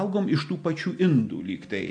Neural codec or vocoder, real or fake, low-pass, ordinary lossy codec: none; real; 9.9 kHz; MP3, 48 kbps